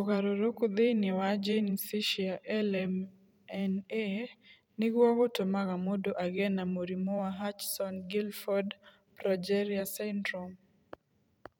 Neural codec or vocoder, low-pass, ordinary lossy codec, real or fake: vocoder, 44.1 kHz, 128 mel bands every 512 samples, BigVGAN v2; 19.8 kHz; none; fake